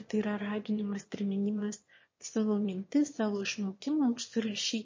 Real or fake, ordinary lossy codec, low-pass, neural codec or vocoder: fake; MP3, 32 kbps; 7.2 kHz; autoencoder, 22.05 kHz, a latent of 192 numbers a frame, VITS, trained on one speaker